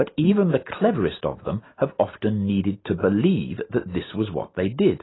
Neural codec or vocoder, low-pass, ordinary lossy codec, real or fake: none; 7.2 kHz; AAC, 16 kbps; real